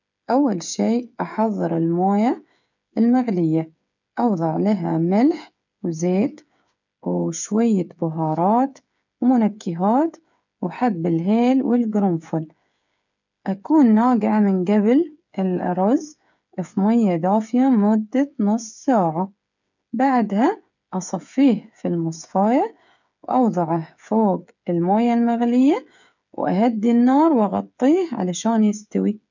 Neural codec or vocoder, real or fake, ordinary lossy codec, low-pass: codec, 16 kHz, 8 kbps, FreqCodec, smaller model; fake; none; 7.2 kHz